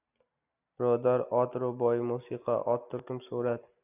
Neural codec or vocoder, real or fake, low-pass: none; real; 3.6 kHz